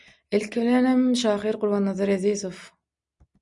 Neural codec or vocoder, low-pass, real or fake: none; 10.8 kHz; real